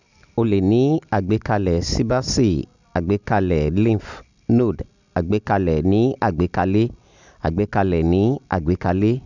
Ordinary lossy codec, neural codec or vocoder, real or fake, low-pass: none; none; real; 7.2 kHz